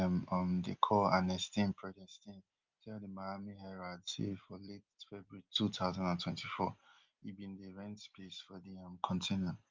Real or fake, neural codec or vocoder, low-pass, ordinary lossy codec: real; none; 7.2 kHz; Opus, 24 kbps